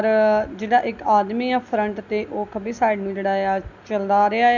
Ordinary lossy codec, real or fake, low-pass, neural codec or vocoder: none; real; 7.2 kHz; none